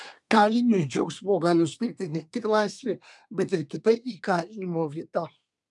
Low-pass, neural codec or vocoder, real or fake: 10.8 kHz; codec, 24 kHz, 1 kbps, SNAC; fake